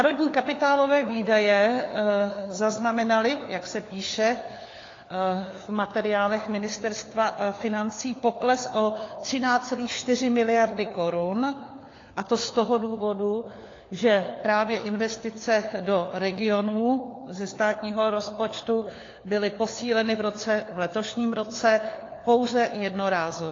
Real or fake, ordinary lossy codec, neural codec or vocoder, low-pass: fake; AAC, 32 kbps; codec, 16 kHz, 4 kbps, FunCodec, trained on LibriTTS, 50 frames a second; 7.2 kHz